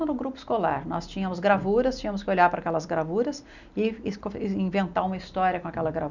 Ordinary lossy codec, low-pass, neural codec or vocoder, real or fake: none; 7.2 kHz; none; real